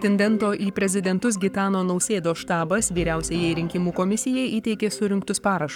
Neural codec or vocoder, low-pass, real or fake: codec, 44.1 kHz, 7.8 kbps, Pupu-Codec; 19.8 kHz; fake